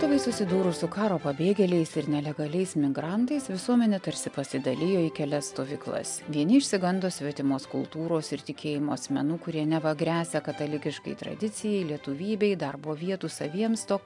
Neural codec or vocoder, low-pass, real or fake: none; 10.8 kHz; real